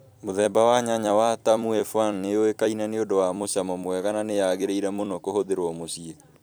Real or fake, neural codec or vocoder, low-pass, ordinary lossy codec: fake; vocoder, 44.1 kHz, 128 mel bands every 256 samples, BigVGAN v2; none; none